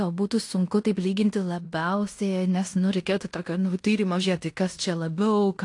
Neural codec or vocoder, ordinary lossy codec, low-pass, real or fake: codec, 16 kHz in and 24 kHz out, 0.9 kbps, LongCat-Audio-Codec, fine tuned four codebook decoder; AAC, 48 kbps; 10.8 kHz; fake